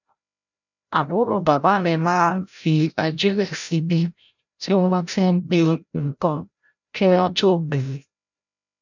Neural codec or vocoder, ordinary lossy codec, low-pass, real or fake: codec, 16 kHz, 0.5 kbps, FreqCodec, larger model; none; 7.2 kHz; fake